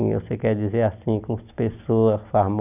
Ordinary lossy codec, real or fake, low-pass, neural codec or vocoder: none; real; 3.6 kHz; none